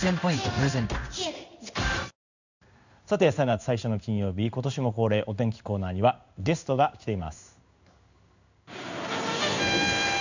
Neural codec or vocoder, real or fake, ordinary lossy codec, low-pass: codec, 16 kHz in and 24 kHz out, 1 kbps, XY-Tokenizer; fake; none; 7.2 kHz